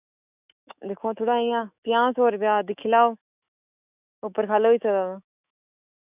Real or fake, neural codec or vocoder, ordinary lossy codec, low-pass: fake; autoencoder, 48 kHz, 128 numbers a frame, DAC-VAE, trained on Japanese speech; none; 3.6 kHz